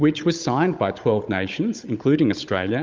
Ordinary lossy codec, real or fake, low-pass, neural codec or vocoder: Opus, 24 kbps; fake; 7.2 kHz; codec, 16 kHz, 16 kbps, FunCodec, trained on Chinese and English, 50 frames a second